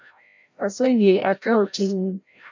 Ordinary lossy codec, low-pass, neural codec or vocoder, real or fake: MP3, 64 kbps; 7.2 kHz; codec, 16 kHz, 0.5 kbps, FreqCodec, larger model; fake